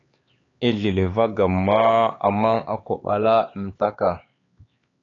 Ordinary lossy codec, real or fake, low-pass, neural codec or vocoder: AAC, 32 kbps; fake; 7.2 kHz; codec, 16 kHz, 4 kbps, X-Codec, HuBERT features, trained on LibriSpeech